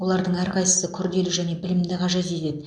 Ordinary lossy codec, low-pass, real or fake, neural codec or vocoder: MP3, 64 kbps; 9.9 kHz; real; none